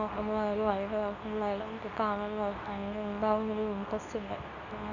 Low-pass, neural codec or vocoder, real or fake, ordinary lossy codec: 7.2 kHz; codec, 24 kHz, 0.9 kbps, WavTokenizer, medium speech release version 2; fake; none